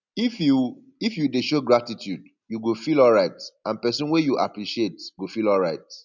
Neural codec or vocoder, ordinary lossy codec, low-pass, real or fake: none; none; 7.2 kHz; real